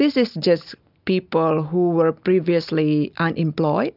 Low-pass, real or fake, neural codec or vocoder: 5.4 kHz; real; none